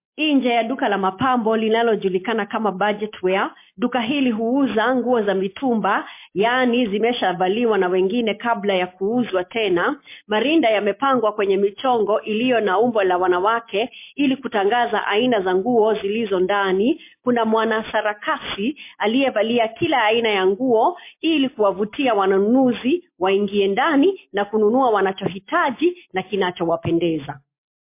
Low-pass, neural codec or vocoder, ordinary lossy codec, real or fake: 3.6 kHz; none; MP3, 24 kbps; real